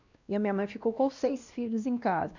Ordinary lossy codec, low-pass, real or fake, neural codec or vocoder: none; 7.2 kHz; fake; codec, 16 kHz, 1 kbps, X-Codec, WavLM features, trained on Multilingual LibriSpeech